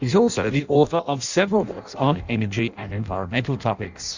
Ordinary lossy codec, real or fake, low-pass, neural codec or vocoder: Opus, 64 kbps; fake; 7.2 kHz; codec, 16 kHz in and 24 kHz out, 0.6 kbps, FireRedTTS-2 codec